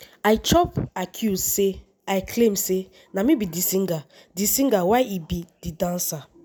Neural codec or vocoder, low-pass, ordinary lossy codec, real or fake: none; none; none; real